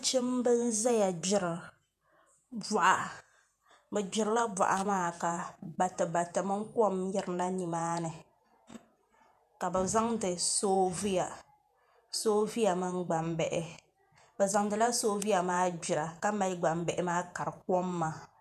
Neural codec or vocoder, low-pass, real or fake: vocoder, 48 kHz, 128 mel bands, Vocos; 14.4 kHz; fake